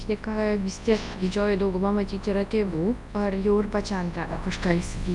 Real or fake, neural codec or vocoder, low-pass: fake; codec, 24 kHz, 0.9 kbps, WavTokenizer, large speech release; 10.8 kHz